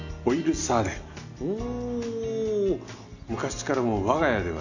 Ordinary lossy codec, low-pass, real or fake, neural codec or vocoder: none; 7.2 kHz; real; none